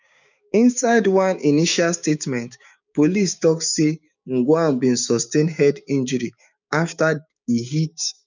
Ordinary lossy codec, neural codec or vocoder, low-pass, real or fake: none; codec, 16 kHz, 6 kbps, DAC; 7.2 kHz; fake